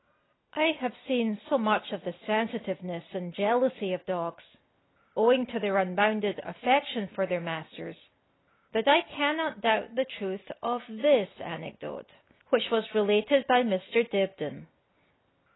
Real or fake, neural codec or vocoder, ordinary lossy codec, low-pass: real; none; AAC, 16 kbps; 7.2 kHz